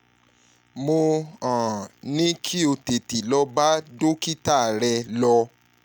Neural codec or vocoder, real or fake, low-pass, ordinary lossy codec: none; real; none; none